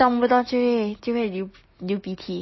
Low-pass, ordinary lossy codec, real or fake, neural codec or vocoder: 7.2 kHz; MP3, 24 kbps; real; none